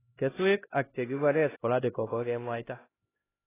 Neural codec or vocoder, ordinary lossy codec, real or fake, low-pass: codec, 16 kHz, 1 kbps, X-Codec, HuBERT features, trained on LibriSpeech; AAC, 16 kbps; fake; 3.6 kHz